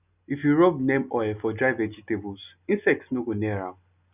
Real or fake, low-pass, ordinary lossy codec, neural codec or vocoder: real; 3.6 kHz; none; none